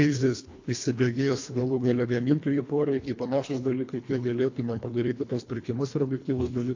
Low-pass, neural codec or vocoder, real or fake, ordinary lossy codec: 7.2 kHz; codec, 24 kHz, 1.5 kbps, HILCodec; fake; AAC, 48 kbps